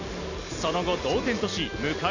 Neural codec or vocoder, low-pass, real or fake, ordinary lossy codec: none; 7.2 kHz; real; none